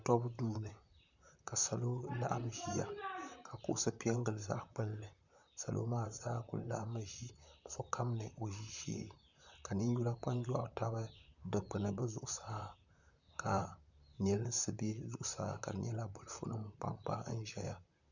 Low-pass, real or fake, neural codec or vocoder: 7.2 kHz; fake; vocoder, 44.1 kHz, 128 mel bands, Pupu-Vocoder